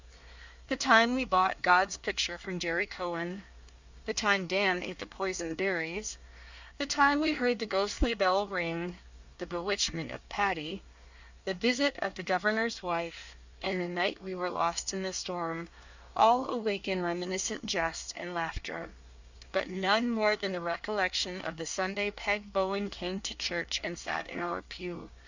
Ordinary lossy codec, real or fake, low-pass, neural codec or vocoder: Opus, 64 kbps; fake; 7.2 kHz; codec, 24 kHz, 1 kbps, SNAC